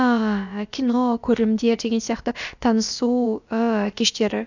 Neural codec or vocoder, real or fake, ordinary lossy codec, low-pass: codec, 16 kHz, about 1 kbps, DyCAST, with the encoder's durations; fake; none; 7.2 kHz